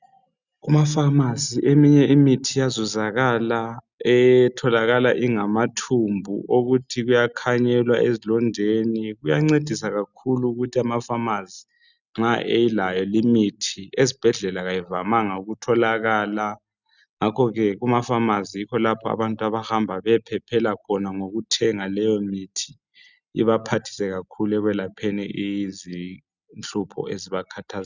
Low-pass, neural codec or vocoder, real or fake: 7.2 kHz; none; real